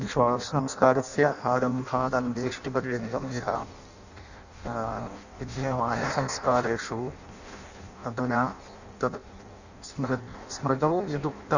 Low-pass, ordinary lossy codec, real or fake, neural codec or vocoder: 7.2 kHz; none; fake; codec, 16 kHz in and 24 kHz out, 0.6 kbps, FireRedTTS-2 codec